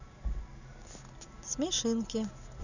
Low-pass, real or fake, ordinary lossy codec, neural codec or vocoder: 7.2 kHz; real; none; none